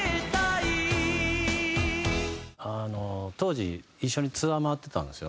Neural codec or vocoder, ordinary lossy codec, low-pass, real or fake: none; none; none; real